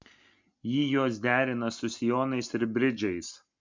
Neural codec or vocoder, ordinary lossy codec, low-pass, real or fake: none; MP3, 64 kbps; 7.2 kHz; real